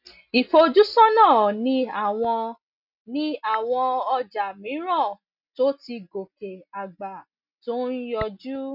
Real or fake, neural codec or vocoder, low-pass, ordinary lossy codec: real; none; 5.4 kHz; none